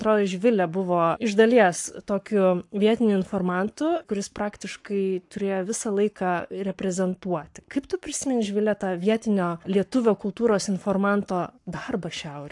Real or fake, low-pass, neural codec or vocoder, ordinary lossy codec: fake; 10.8 kHz; codec, 44.1 kHz, 7.8 kbps, Pupu-Codec; AAC, 64 kbps